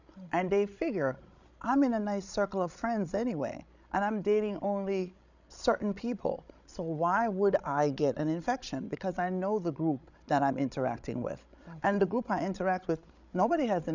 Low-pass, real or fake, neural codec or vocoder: 7.2 kHz; fake; codec, 16 kHz, 16 kbps, FreqCodec, larger model